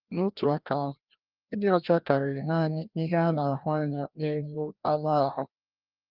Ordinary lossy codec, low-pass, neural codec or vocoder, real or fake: Opus, 32 kbps; 5.4 kHz; codec, 16 kHz, 1 kbps, FreqCodec, larger model; fake